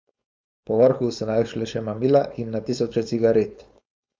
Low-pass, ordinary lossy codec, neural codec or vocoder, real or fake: none; none; codec, 16 kHz, 4.8 kbps, FACodec; fake